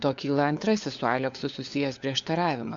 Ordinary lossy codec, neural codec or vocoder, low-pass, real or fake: AAC, 64 kbps; codec, 16 kHz, 4 kbps, FunCodec, trained on LibriTTS, 50 frames a second; 7.2 kHz; fake